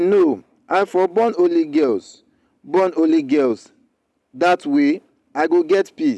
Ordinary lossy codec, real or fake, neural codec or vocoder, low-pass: none; real; none; none